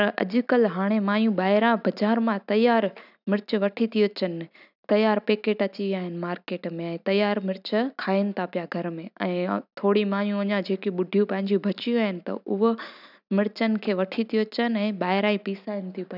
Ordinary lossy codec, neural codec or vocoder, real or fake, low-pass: none; none; real; 5.4 kHz